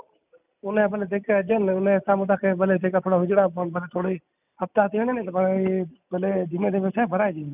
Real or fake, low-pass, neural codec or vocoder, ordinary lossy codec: real; 3.6 kHz; none; none